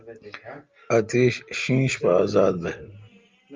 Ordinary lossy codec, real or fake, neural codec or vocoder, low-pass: Opus, 24 kbps; real; none; 7.2 kHz